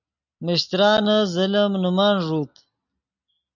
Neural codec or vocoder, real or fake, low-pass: none; real; 7.2 kHz